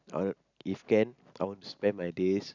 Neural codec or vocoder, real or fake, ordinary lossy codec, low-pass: none; real; none; 7.2 kHz